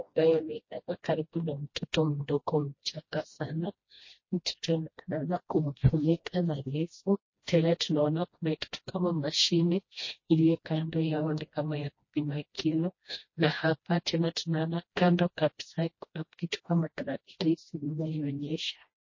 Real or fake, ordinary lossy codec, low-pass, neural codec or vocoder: fake; MP3, 32 kbps; 7.2 kHz; codec, 16 kHz, 1 kbps, FreqCodec, smaller model